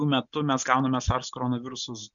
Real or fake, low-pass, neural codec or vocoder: real; 7.2 kHz; none